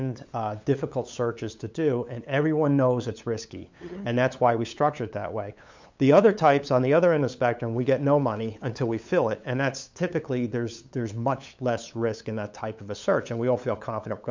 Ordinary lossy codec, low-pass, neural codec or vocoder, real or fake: MP3, 64 kbps; 7.2 kHz; codec, 16 kHz, 8 kbps, FunCodec, trained on LibriTTS, 25 frames a second; fake